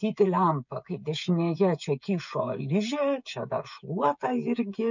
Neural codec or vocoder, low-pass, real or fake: codec, 16 kHz, 16 kbps, FreqCodec, smaller model; 7.2 kHz; fake